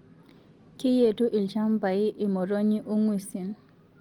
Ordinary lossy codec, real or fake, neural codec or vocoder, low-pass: Opus, 24 kbps; real; none; 19.8 kHz